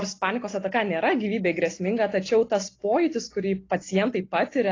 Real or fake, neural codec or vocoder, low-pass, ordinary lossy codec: real; none; 7.2 kHz; AAC, 32 kbps